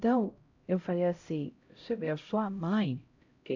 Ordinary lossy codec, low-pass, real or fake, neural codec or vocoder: AAC, 48 kbps; 7.2 kHz; fake; codec, 16 kHz, 0.5 kbps, X-Codec, HuBERT features, trained on LibriSpeech